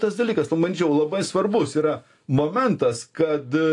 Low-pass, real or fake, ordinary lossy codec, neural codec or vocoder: 10.8 kHz; real; AAC, 48 kbps; none